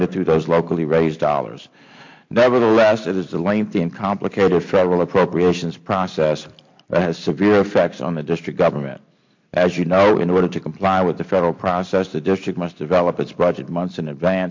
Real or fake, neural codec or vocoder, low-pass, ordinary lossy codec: real; none; 7.2 kHz; MP3, 48 kbps